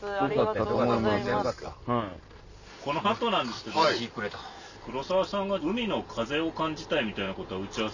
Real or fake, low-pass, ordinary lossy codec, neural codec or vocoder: real; 7.2 kHz; none; none